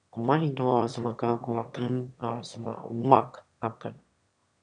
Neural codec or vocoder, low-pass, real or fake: autoencoder, 22.05 kHz, a latent of 192 numbers a frame, VITS, trained on one speaker; 9.9 kHz; fake